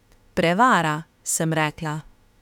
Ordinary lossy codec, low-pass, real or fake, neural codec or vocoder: none; 19.8 kHz; fake; autoencoder, 48 kHz, 32 numbers a frame, DAC-VAE, trained on Japanese speech